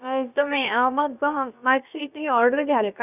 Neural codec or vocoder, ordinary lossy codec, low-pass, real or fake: codec, 16 kHz, about 1 kbps, DyCAST, with the encoder's durations; none; 3.6 kHz; fake